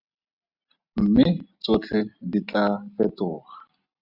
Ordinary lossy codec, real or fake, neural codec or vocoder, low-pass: Opus, 64 kbps; real; none; 5.4 kHz